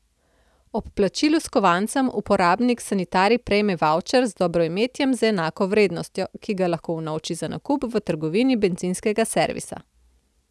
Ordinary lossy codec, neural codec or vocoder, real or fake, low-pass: none; none; real; none